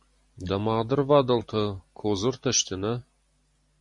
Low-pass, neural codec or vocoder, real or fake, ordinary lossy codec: 10.8 kHz; none; real; MP3, 48 kbps